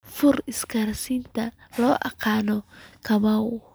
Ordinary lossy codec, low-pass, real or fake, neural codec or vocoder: none; none; real; none